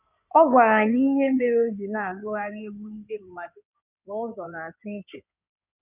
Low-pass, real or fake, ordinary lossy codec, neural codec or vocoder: 3.6 kHz; fake; none; codec, 16 kHz in and 24 kHz out, 2.2 kbps, FireRedTTS-2 codec